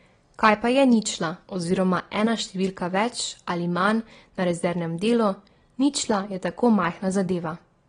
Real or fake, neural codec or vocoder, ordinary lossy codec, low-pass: real; none; AAC, 32 kbps; 9.9 kHz